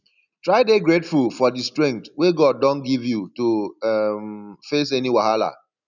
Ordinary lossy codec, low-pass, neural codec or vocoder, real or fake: none; 7.2 kHz; none; real